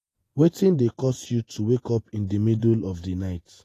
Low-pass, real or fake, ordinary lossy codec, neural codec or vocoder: 14.4 kHz; fake; AAC, 48 kbps; vocoder, 48 kHz, 128 mel bands, Vocos